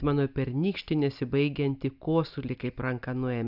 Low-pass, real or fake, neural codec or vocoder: 5.4 kHz; real; none